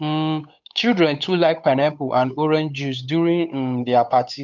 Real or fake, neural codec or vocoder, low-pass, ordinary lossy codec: fake; codec, 16 kHz, 8 kbps, FunCodec, trained on Chinese and English, 25 frames a second; 7.2 kHz; none